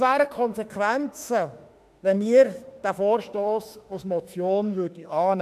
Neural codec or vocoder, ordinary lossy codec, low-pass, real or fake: autoencoder, 48 kHz, 32 numbers a frame, DAC-VAE, trained on Japanese speech; AAC, 96 kbps; 14.4 kHz; fake